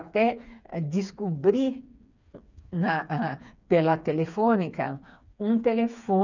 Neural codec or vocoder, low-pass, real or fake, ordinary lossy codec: codec, 16 kHz, 4 kbps, FreqCodec, smaller model; 7.2 kHz; fake; none